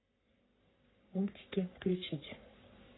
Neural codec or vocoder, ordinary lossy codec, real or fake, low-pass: codec, 44.1 kHz, 3.4 kbps, Pupu-Codec; AAC, 16 kbps; fake; 7.2 kHz